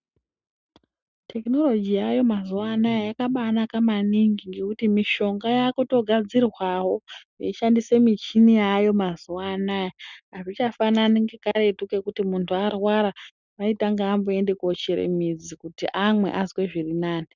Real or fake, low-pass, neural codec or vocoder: real; 7.2 kHz; none